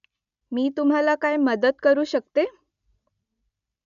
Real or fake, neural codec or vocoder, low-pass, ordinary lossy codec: real; none; 7.2 kHz; none